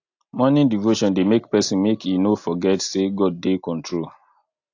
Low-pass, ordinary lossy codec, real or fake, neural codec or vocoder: 7.2 kHz; AAC, 48 kbps; real; none